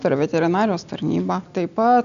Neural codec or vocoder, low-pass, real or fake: none; 7.2 kHz; real